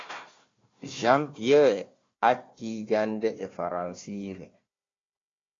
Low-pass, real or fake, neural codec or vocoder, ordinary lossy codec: 7.2 kHz; fake; codec, 16 kHz, 1 kbps, FunCodec, trained on Chinese and English, 50 frames a second; AAC, 32 kbps